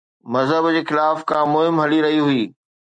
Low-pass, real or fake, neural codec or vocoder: 9.9 kHz; real; none